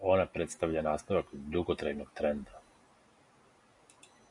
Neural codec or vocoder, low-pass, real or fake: none; 10.8 kHz; real